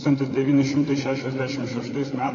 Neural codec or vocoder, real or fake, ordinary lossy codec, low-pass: codec, 16 kHz, 8 kbps, FreqCodec, larger model; fake; AAC, 32 kbps; 7.2 kHz